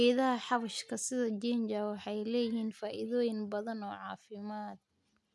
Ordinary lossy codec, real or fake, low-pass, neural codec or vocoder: none; real; none; none